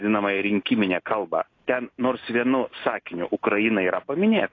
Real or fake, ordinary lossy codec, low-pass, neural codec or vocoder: real; AAC, 32 kbps; 7.2 kHz; none